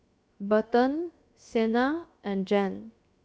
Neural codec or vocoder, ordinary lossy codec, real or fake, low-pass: codec, 16 kHz, 0.7 kbps, FocalCodec; none; fake; none